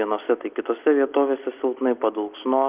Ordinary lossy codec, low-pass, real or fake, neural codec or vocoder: Opus, 32 kbps; 3.6 kHz; real; none